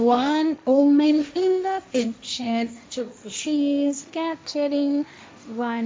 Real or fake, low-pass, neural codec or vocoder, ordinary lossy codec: fake; none; codec, 16 kHz, 1.1 kbps, Voila-Tokenizer; none